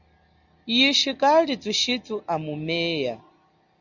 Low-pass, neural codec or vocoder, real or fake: 7.2 kHz; none; real